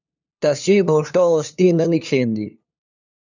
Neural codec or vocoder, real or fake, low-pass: codec, 16 kHz, 2 kbps, FunCodec, trained on LibriTTS, 25 frames a second; fake; 7.2 kHz